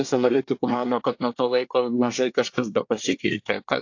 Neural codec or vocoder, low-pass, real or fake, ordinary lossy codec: codec, 24 kHz, 1 kbps, SNAC; 7.2 kHz; fake; AAC, 48 kbps